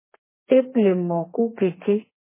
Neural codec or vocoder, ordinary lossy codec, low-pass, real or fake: codec, 32 kHz, 1.9 kbps, SNAC; MP3, 16 kbps; 3.6 kHz; fake